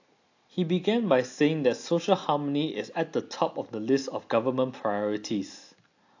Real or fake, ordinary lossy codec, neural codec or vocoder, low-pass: real; AAC, 48 kbps; none; 7.2 kHz